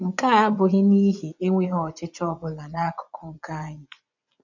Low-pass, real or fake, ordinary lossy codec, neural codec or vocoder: 7.2 kHz; fake; none; vocoder, 24 kHz, 100 mel bands, Vocos